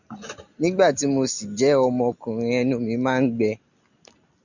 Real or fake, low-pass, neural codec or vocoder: real; 7.2 kHz; none